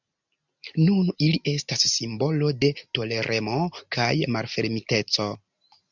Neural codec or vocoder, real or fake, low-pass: none; real; 7.2 kHz